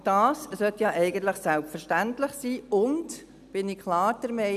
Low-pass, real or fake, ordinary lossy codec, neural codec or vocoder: 14.4 kHz; real; none; none